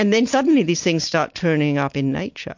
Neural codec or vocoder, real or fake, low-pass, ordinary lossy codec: none; real; 7.2 kHz; MP3, 48 kbps